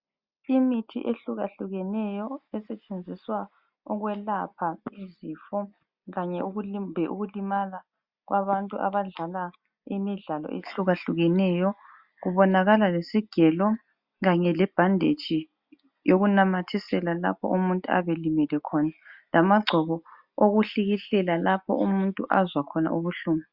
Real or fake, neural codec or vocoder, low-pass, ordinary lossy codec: real; none; 5.4 kHz; Opus, 64 kbps